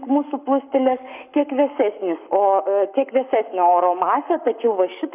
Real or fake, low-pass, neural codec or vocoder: fake; 3.6 kHz; codec, 44.1 kHz, 7.8 kbps, Pupu-Codec